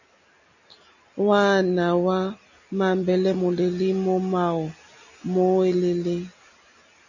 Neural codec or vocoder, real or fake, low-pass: none; real; 7.2 kHz